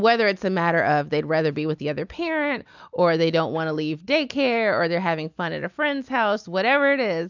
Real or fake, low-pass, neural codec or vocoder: real; 7.2 kHz; none